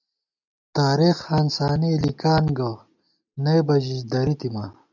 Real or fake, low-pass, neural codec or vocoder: real; 7.2 kHz; none